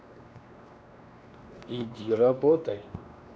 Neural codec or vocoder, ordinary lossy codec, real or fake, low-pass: codec, 16 kHz, 2 kbps, X-Codec, WavLM features, trained on Multilingual LibriSpeech; none; fake; none